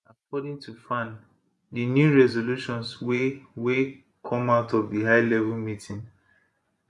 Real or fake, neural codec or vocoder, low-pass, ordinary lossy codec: fake; vocoder, 24 kHz, 100 mel bands, Vocos; none; none